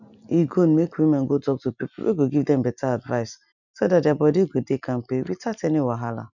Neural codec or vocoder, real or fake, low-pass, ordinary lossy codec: none; real; 7.2 kHz; none